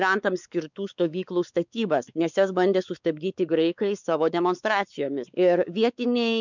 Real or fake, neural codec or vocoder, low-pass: fake; codec, 16 kHz, 4 kbps, X-Codec, WavLM features, trained on Multilingual LibriSpeech; 7.2 kHz